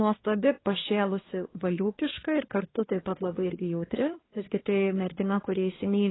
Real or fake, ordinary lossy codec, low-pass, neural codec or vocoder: fake; AAC, 16 kbps; 7.2 kHz; codec, 24 kHz, 1 kbps, SNAC